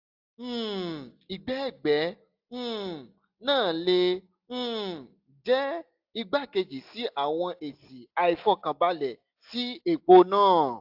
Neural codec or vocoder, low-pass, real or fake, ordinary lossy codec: none; 5.4 kHz; real; AAC, 48 kbps